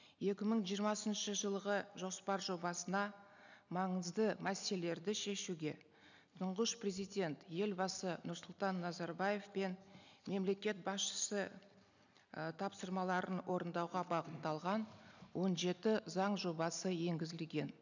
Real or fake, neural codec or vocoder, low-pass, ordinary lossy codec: real; none; 7.2 kHz; none